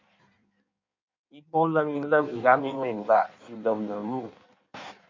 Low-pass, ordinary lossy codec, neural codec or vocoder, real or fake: 7.2 kHz; MP3, 48 kbps; codec, 16 kHz in and 24 kHz out, 1.1 kbps, FireRedTTS-2 codec; fake